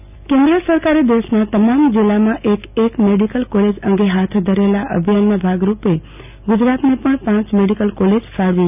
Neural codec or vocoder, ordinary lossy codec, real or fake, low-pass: none; none; real; 3.6 kHz